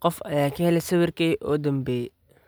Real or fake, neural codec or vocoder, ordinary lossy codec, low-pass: real; none; none; none